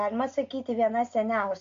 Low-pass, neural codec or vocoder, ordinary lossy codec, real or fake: 7.2 kHz; none; AAC, 48 kbps; real